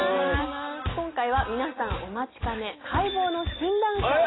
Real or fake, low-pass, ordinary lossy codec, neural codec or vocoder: real; 7.2 kHz; AAC, 16 kbps; none